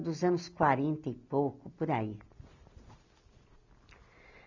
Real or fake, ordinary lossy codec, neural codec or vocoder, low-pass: real; none; none; 7.2 kHz